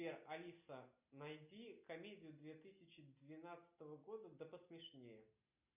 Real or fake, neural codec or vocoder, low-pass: real; none; 3.6 kHz